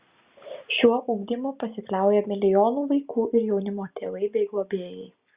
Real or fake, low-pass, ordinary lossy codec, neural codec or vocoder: real; 3.6 kHz; Opus, 64 kbps; none